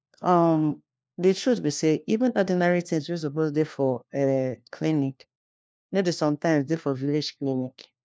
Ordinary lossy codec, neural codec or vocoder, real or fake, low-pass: none; codec, 16 kHz, 1 kbps, FunCodec, trained on LibriTTS, 50 frames a second; fake; none